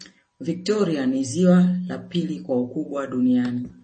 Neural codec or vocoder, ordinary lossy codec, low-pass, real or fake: none; MP3, 32 kbps; 10.8 kHz; real